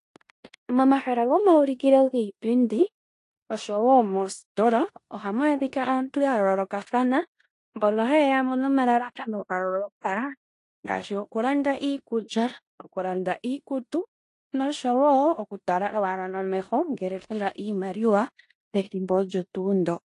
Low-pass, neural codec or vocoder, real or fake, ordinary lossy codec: 10.8 kHz; codec, 16 kHz in and 24 kHz out, 0.9 kbps, LongCat-Audio-Codec, four codebook decoder; fake; AAC, 48 kbps